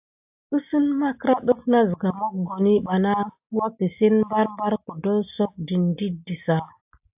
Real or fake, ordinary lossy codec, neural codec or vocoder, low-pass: real; AAC, 32 kbps; none; 3.6 kHz